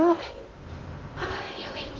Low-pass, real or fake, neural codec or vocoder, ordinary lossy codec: 7.2 kHz; fake; codec, 16 kHz in and 24 kHz out, 0.6 kbps, FocalCodec, streaming, 2048 codes; Opus, 16 kbps